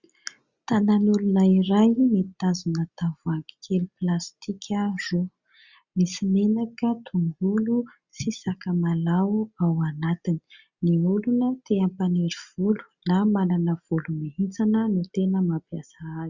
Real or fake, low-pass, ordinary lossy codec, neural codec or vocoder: real; 7.2 kHz; Opus, 64 kbps; none